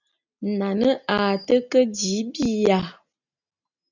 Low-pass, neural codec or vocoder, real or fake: 7.2 kHz; none; real